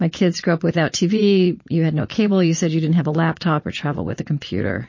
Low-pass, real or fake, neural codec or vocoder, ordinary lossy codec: 7.2 kHz; fake; vocoder, 22.05 kHz, 80 mel bands, Vocos; MP3, 32 kbps